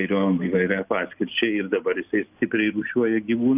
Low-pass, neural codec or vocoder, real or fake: 3.6 kHz; none; real